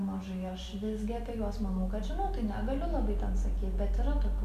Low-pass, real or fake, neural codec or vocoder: 14.4 kHz; real; none